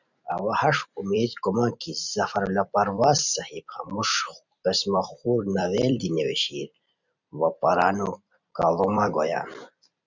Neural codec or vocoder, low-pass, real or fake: vocoder, 44.1 kHz, 128 mel bands every 256 samples, BigVGAN v2; 7.2 kHz; fake